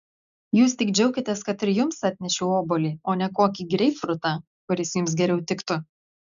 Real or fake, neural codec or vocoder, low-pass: real; none; 7.2 kHz